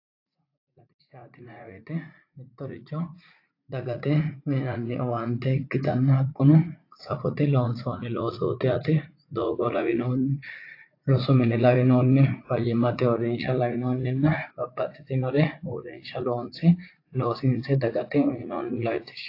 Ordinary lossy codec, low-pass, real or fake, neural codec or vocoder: AAC, 32 kbps; 5.4 kHz; fake; vocoder, 44.1 kHz, 80 mel bands, Vocos